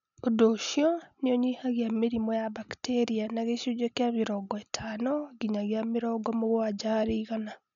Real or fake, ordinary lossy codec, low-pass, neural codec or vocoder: real; none; 7.2 kHz; none